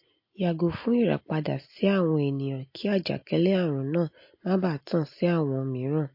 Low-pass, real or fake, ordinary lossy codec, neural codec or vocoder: 5.4 kHz; real; MP3, 32 kbps; none